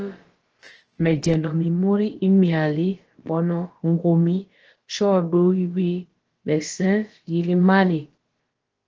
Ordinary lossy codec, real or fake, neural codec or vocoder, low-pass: Opus, 16 kbps; fake; codec, 16 kHz, about 1 kbps, DyCAST, with the encoder's durations; 7.2 kHz